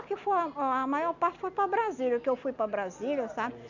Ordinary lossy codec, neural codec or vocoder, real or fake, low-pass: none; none; real; 7.2 kHz